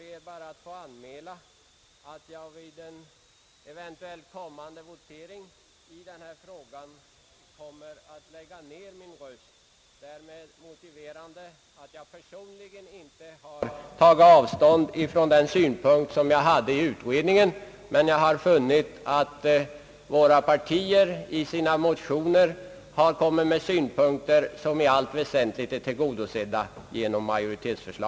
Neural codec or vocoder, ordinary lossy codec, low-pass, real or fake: none; none; none; real